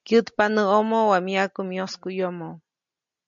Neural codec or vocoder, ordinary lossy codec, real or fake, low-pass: none; MP3, 64 kbps; real; 7.2 kHz